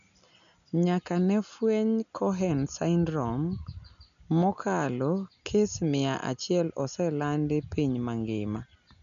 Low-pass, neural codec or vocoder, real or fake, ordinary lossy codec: 7.2 kHz; none; real; none